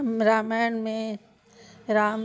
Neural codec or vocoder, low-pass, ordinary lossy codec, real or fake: none; none; none; real